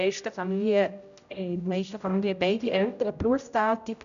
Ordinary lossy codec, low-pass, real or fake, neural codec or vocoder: none; 7.2 kHz; fake; codec, 16 kHz, 0.5 kbps, X-Codec, HuBERT features, trained on general audio